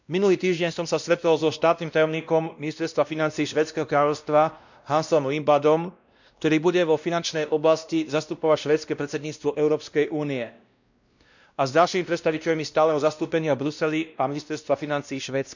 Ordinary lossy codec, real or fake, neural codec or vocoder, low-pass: none; fake; codec, 16 kHz, 1 kbps, X-Codec, WavLM features, trained on Multilingual LibriSpeech; 7.2 kHz